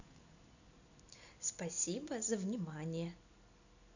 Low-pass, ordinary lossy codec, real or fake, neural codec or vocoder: 7.2 kHz; none; real; none